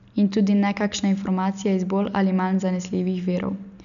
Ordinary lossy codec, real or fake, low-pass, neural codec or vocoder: none; real; 7.2 kHz; none